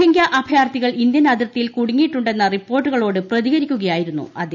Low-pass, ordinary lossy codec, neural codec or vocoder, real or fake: 7.2 kHz; none; none; real